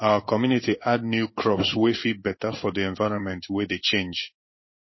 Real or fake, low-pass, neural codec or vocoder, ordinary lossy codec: fake; 7.2 kHz; codec, 16 kHz in and 24 kHz out, 1 kbps, XY-Tokenizer; MP3, 24 kbps